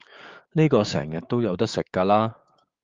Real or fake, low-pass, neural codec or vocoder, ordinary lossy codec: fake; 7.2 kHz; codec, 16 kHz, 6 kbps, DAC; Opus, 32 kbps